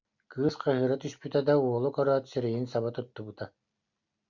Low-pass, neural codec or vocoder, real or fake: 7.2 kHz; none; real